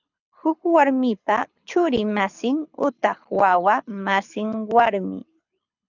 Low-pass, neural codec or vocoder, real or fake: 7.2 kHz; codec, 24 kHz, 6 kbps, HILCodec; fake